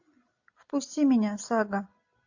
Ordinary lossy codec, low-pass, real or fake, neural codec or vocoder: AAC, 48 kbps; 7.2 kHz; real; none